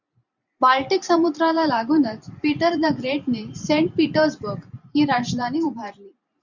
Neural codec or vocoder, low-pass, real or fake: vocoder, 44.1 kHz, 128 mel bands every 512 samples, BigVGAN v2; 7.2 kHz; fake